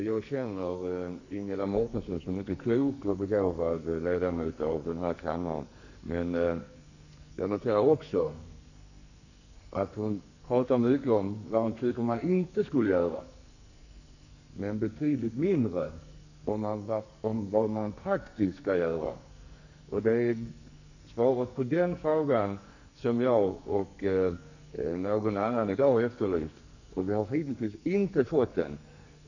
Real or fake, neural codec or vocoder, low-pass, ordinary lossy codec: fake; codec, 44.1 kHz, 2.6 kbps, SNAC; 7.2 kHz; none